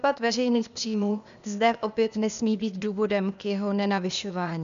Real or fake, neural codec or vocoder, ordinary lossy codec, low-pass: fake; codec, 16 kHz, 0.8 kbps, ZipCodec; MP3, 96 kbps; 7.2 kHz